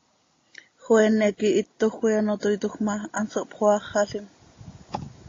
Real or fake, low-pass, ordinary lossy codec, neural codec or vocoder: real; 7.2 kHz; AAC, 32 kbps; none